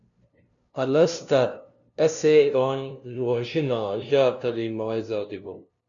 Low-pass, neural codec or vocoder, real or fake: 7.2 kHz; codec, 16 kHz, 0.5 kbps, FunCodec, trained on LibriTTS, 25 frames a second; fake